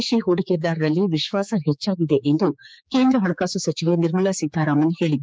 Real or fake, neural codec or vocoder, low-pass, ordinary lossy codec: fake; codec, 16 kHz, 4 kbps, X-Codec, HuBERT features, trained on general audio; none; none